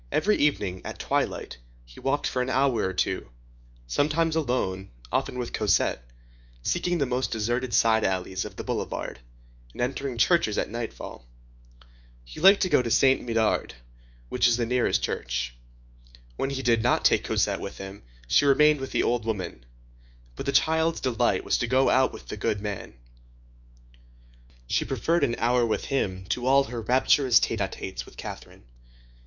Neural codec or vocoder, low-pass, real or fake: autoencoder, 48 kHz, 128 numbers a frame, DAC-VAE, trained on Japanese speech; 7.2 kHz; fake